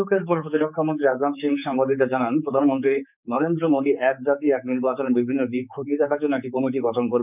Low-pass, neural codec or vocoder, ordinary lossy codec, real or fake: 3.6 kHz; codec, 16 kHz, 4 kbps, X-Codec, HuBERT features, trained on general audio; none; fake